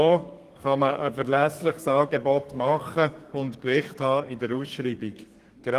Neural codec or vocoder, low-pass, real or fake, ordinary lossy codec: codec, 32 kHz, 1.9 kbps, SNAC; 14.4 kHz; fake; Opus, 24 kbps